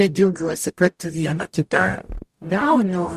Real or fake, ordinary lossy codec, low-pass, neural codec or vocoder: fake; Opus, 64 kbps; 14.4 kHz; codec, 44.1 kHz, 0.9 kbps, DAC